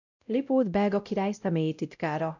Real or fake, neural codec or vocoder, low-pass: fake; codec, 16 kHz, 0.5 kbps, X-Codec, WavLM features, trained on Multilingual LibriSpeech; 7.2 kHz